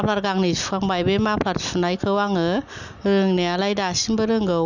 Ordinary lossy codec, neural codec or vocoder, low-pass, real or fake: none; none; 7.2 kHz; real